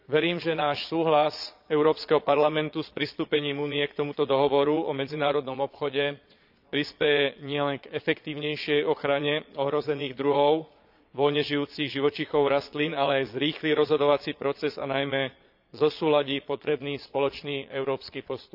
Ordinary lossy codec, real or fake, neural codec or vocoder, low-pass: none; fake; vocoder, 22.05 kHz, 80 mel bands, Vocos; 5.4 kHz